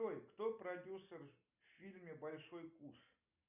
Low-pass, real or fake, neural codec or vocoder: 3.6 kHz; real; none